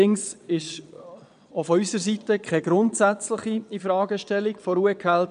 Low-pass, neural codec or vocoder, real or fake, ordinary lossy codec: 9.9 kHz; vocoder, 22.05 kHz, 80 mel bands, Vocos; fake; none